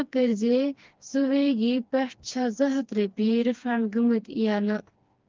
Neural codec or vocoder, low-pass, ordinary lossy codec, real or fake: codec, 16 kHz, 2 kbps, FreqCodec, smaller model; 7.2 kHz; Opus, 24 kbps; fake